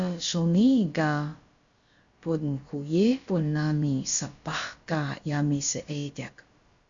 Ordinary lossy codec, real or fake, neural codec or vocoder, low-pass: Opus, 64 kbps; fake; codec, 16 kHz, about 1 kbps, DyCAST, with the encoder's durations; 7.2 kHz